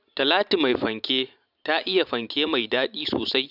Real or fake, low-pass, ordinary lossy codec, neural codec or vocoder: fake; 5.4 kHz; none; vocoder, 44.1 kHz, 128 mel bands every 512 samples, BigVGAN v2